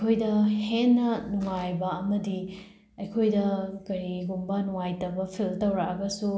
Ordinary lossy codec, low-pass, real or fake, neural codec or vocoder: none; none; real; none